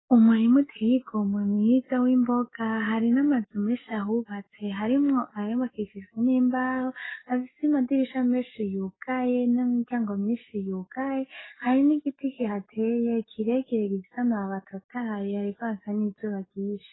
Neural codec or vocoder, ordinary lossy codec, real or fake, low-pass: codec, 44.1 kHz, 7.8 kbps, DAC; AAC, 16 kbps; fake; 7.2 kHz